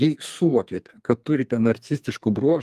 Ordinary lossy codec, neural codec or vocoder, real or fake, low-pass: Opus, 32 kbps; codec, 32 kHz, 1.9 kbps, SNAC; fake; 14.4 kHz